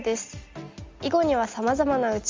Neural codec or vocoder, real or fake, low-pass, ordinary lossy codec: none; real; 7.2 kHz; Opus, 32 kbps